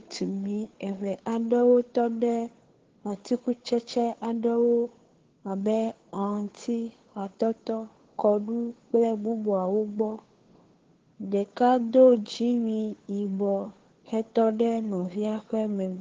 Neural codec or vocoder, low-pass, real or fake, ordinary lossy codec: codec, 16 kHz, 2 kbps, FunCodec, trained on Chinese and English, 25 frames a second; 7.2 kHz; fake; Opus, 16 kbps